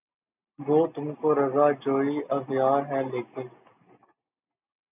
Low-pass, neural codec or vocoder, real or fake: 3.6 kHz; none; real